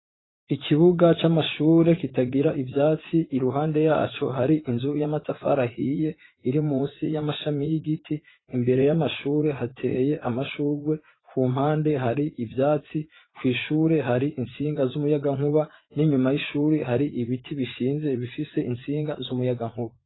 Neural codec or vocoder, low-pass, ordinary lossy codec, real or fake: vocoder, 44.1 kHz, 80 mel bands, Vocos; 7.2 kHz; AAC, 16 kbps; fake